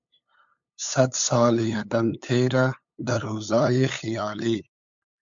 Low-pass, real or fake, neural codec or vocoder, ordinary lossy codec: 7.2 kHz; fake; codec, 16 kHz, 8 kbps, FunCodec, trained on LibriTTS, 25 frames a second; MP3, 64 kbps